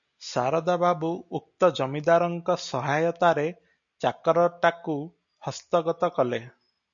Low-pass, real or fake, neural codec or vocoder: 7.2 kHz; real; none